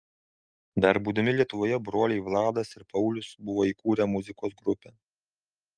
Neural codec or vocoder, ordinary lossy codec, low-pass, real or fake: none; Opus, 32 kbps; 9.9 kHz; real